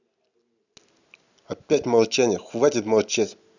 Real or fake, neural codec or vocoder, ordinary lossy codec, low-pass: real; none; none; 7.2 kHz